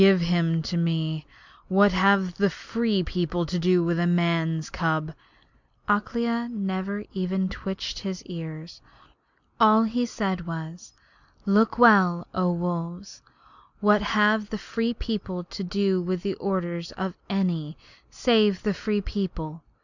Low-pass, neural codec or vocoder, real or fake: 7.2 kHz; none; real